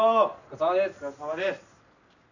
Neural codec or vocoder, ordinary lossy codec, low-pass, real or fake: none; none; 7.2 kHz; real